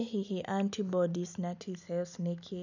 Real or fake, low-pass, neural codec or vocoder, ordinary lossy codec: real; 7.2 kHz; none; none